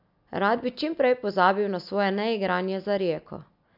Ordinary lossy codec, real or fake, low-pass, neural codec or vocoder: none; real; 5.4 kHz; none